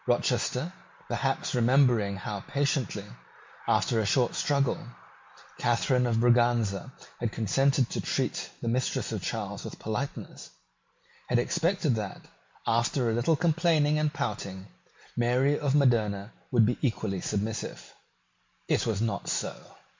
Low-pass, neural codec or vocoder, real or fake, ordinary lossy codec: 7.2 kHz; none; real; MP3, 64 kbps